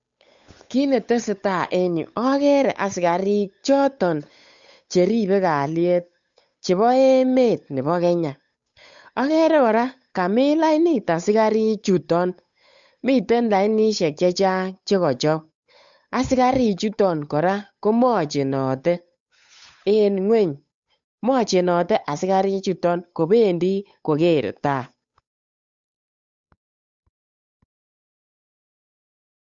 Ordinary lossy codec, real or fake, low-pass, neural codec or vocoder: MP3, 64 kbps; fake; 7.2 kHz; codec, 16 kHz, 8 kbps, FunCodec, trained on Chinese and English, 25 frames a second